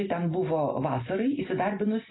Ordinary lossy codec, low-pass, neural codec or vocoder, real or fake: AAC, 16 kbps; 7.2 kHz; none; real